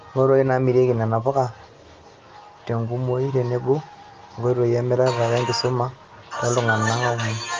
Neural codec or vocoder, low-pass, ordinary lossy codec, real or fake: none; 7.2 kHz; Opus, 24 kbps; real